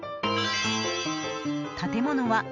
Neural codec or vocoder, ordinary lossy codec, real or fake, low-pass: none; none; real; 7.2 kHz